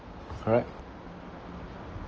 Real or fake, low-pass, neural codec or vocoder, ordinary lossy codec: real; 7.2 kHz; none; Opus, 24 kbps